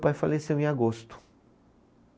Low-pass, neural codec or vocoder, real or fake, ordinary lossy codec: none; none; real; none